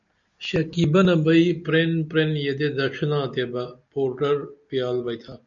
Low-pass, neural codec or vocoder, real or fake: 7.2 kHz; none; real